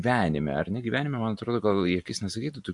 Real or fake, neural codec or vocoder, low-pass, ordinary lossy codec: real; none; 10.8 kHz; AAC, 64 kbps